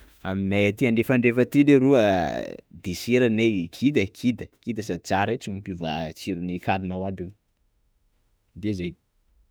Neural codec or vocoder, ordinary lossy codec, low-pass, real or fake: autoencoder, 48 kHz, 32 numbers a frame, DAC-VAE, trained on Japanese speech; none; none; fake